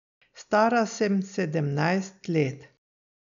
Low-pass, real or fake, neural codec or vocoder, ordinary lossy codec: 7.2 kHz; real; none; none